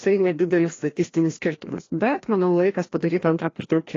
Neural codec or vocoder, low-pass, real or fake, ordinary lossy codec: codec, 16 kHz, 1 kbps, FreqCodec, larger model; 7.2 kHz; fake; AAC, 32 kbps